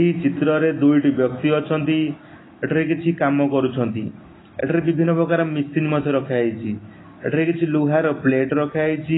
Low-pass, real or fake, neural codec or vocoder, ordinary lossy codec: 7.2 kHz; real; none; AAC, 16 kbps